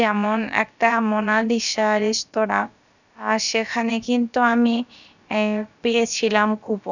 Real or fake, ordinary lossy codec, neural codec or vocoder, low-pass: fake; none; codec, 16 kHz, about 1 kbps, DyCAST, with the encoder's durations; 7.2 kHz